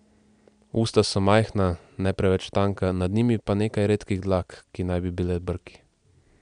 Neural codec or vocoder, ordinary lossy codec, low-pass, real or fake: none; none; 9.9 kHz; real